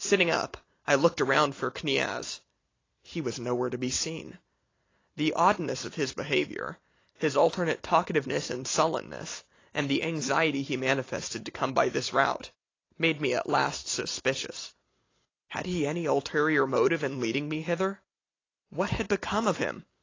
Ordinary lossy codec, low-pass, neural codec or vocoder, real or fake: AAC, 32 kbps; 7.2 kHz; none; real